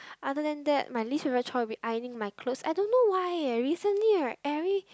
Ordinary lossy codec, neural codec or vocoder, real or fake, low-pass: none; none; real; none